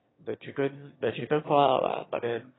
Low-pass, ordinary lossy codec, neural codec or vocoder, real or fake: 7.2 kHz; AAC, 16 kbps; autoencoder, 22.05 kHz, a latent of 192 numbers a frame, VITS, trained on one speaker; fake